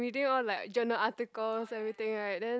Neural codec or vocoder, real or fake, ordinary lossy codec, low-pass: none; real; none; none